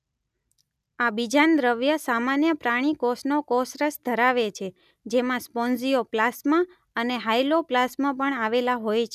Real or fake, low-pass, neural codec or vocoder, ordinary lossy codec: real; 14.4 kHz; none; none